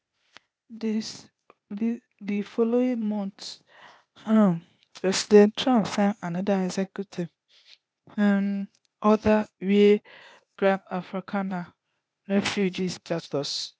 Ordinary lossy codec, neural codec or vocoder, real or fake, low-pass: none; codec, 16 kHz, 0.8 kbps, ZipCodec; fake; none